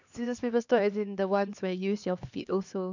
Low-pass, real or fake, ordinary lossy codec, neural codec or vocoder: 7.2 kHz; fake; none; codec, 16 kHz, 2 kbps, X-Codec, HuBERT features, trained on LibriSpeech